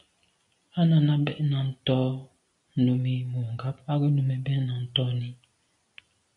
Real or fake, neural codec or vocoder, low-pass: real; none; 10.8 kHz